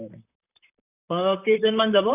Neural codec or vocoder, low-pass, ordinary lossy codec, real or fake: vocoder, 44.1 kHz, 128 mel bands, Pupu-Vocoder; 3.6 kHz; none; fake